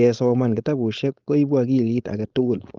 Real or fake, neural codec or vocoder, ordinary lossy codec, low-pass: fake; codec, 16 kHz, 4.8 kbps, FACodec; Opus, 32 kbps; 7.2 kHz